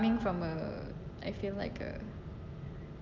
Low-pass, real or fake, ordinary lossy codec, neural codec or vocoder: 7.2 kHz; real; Opus, 32 kbps; none